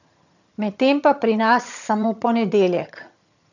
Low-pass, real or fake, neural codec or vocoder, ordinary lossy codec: 7.2 kHz; fake; vocoder, 22.05 kHz, 80 mel bands, HiFi-GAN; none